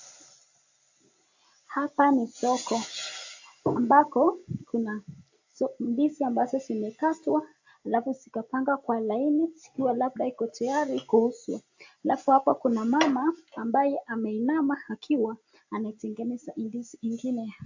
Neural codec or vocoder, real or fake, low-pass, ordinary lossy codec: none; real; 7.2 kHz; AAC, 48 kbps